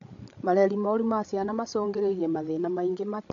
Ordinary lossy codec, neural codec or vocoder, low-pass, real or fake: AAC, 48 kbps; codec, 16 kHz, 8 kbps, FreqCodec, larger model; 7.2 kHz; fake